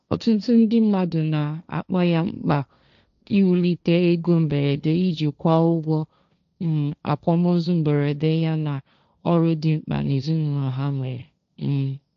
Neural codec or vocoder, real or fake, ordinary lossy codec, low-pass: codec, 16 kHz, 1.1 kbps, Voila-Tokenizer; fake; none; 7.2 kHz